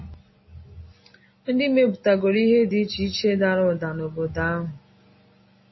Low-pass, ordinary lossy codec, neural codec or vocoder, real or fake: 7.2 kHz; MP3, 24 kbps; none; real